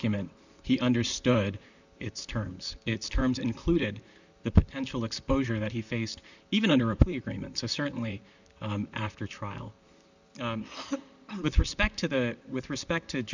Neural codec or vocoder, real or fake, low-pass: vocoder, 44.1 kHz, 128 mel bands, Pupu-Vocoder; fake; 7.2 kHz